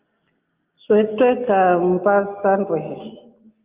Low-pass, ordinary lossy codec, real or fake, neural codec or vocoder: 3.6 kHz; Opus, 24 kbps; real; none